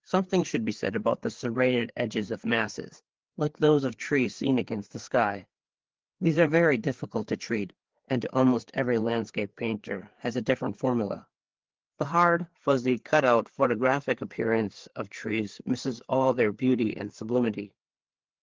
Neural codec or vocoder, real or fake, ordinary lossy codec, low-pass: codec, 16 kHz, 4 kbps, FreqCodec, larger model; fake; Opus, 16 kbps; 7.2 kHz